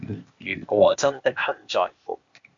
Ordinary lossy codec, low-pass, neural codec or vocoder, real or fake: MP3, 64 kbps; 7.2 kHz; codec, 16 kHz, 0.8 kbps, ZipCodec; fake